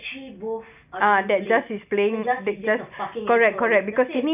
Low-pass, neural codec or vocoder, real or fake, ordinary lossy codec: 3.6 kHz; none; real; none